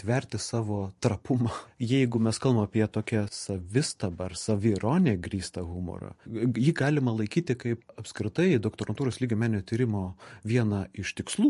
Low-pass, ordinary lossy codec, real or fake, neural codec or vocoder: 14.4 kHz; MP3, 48 kbps; fake; vocoder, 44.1 kHz, 128 mel bands every 512 samples, BigVGAN v2